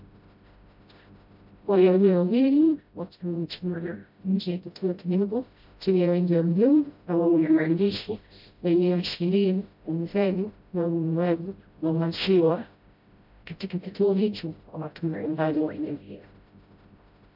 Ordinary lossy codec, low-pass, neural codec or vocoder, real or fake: MP3, 48 kbps; 5.4 kHz; codec, 16 kHz, 0.5 kbps, FreqCodec, smaller model; fake